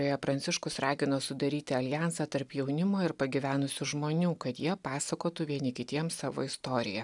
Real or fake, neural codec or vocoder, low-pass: real; none; 10.8 kHz